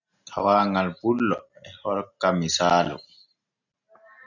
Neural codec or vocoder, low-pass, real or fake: none; 7.2 kHz; real